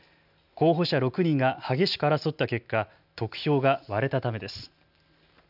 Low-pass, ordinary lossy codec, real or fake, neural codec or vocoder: 5.4 kHz; none; real; none